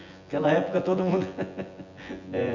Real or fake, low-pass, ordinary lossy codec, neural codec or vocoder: fake; 7.2 kHz; none; vocoder, 24 kHz, 100 mel bands, Vocos